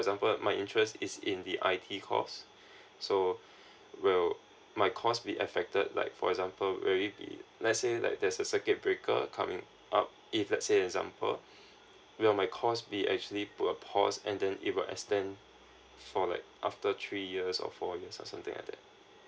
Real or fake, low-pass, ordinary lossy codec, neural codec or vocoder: real; none; none; none